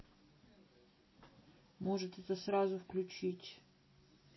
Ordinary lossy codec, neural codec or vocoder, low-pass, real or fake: MP3, 24 kbps; none; 7.2 kHz; real